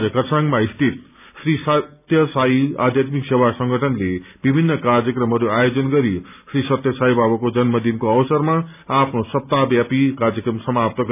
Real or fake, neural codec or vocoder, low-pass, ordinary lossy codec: real; none; 3.6 kHz; none